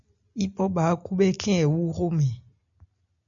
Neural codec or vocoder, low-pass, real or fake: none; 7.2 kHz; real